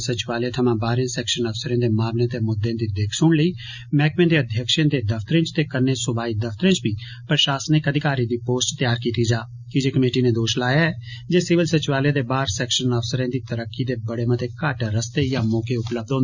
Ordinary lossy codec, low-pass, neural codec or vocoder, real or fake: Opus, 64 kbps; 7.2 kHz; none; real